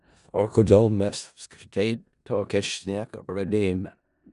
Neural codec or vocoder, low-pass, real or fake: codec, 16 kHz in and 24 kHz out, 0.4 kbps, LongCat-Audio-Codec, four codebook decoder; 10.8 kHz; fake